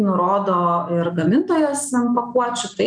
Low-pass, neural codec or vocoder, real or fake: 9.9 kHz; none; real